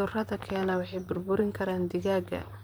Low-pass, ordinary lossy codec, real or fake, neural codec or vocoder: none; none; fake; vocoder, 44.1 kHz, 128 mel bands, Pupu-Vocoder